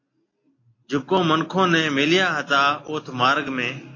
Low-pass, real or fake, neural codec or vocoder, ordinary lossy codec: 7.2 kHz; real; none; AAC, 32 kbps